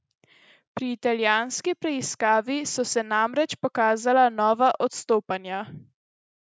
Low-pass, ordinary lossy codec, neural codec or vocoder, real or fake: none; none; none; real